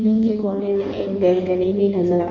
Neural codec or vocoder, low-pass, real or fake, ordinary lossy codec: codec, 16 kHz in and 24 kHz out, 1.1 kbps, FireRedTTS-2 codec; 7.2 kHz; fake; Opus, 64 kbps